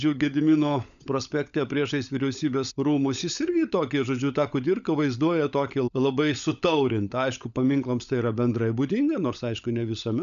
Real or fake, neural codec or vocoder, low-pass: fake; codec, 16 kHz, 16 kbps, FunCodec, trained on LibriTTS, 50 frames a second; 7.2 kHz